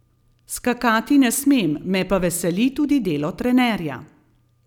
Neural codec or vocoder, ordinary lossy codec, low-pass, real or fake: none; none; 19.8 kHz; real